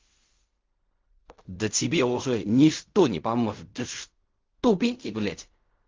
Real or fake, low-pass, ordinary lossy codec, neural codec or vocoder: fake; 7.2 kHz; Opus, 32 kbps; codec, 16 kHz in and 24 kHz out, 0.4 kbps, LongCat-Audio-Codec, fine tuned four codebook decoder